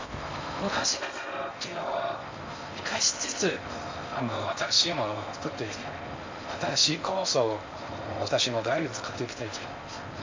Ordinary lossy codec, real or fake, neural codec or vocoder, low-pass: MP3, 48 kbps; fake; codec, 16 kHz in and 24 kHz out, 0.6 kbps, FocalCodec, streaming, 4096 codes; 7.2 kHz